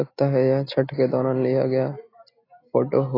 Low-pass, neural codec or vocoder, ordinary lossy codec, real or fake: 5.4 kHz; none; AAC, 24 kbps; real